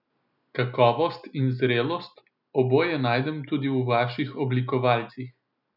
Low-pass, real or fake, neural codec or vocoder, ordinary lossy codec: 5.4 kHz; real; none; none